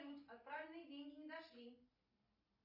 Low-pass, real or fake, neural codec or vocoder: 5.4 kHz; real; none